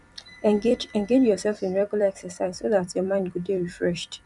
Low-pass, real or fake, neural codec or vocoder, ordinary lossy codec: 10.8 kHz; fake; vocoder, 44.1 kHz, 128 mel bands every 256 samples, BigVGAN v2; none